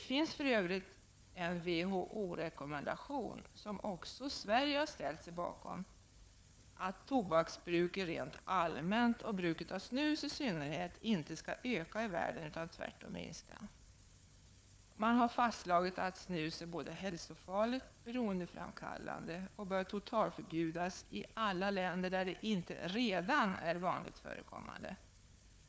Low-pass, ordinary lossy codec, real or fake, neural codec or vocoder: none; none; fake; codec, 16 kHz, 4 kbps, FunCodec, trained on Chinese and English, 50 frames a second